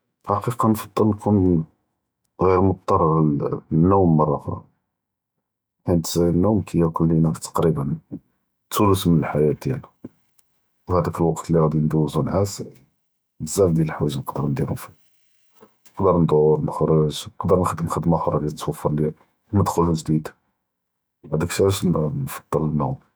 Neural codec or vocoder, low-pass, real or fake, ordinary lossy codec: autoencoder, 48 kHz, 128 numbers a frame, DAC-VAE, trained on Japanese speech; none; fake; none